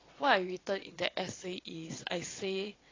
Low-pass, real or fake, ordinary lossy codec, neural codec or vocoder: 7.2 kHz; fake; AAC, 32 kbps; vocoder, 22.05 kHz, 80 mel bands, WaveNeXt